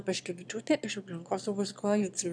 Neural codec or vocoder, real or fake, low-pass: autoencoder, 22.05 kHz, a latent of 192 numbers a frame, VITS, trained on one speaker; fake; 9.9 kHz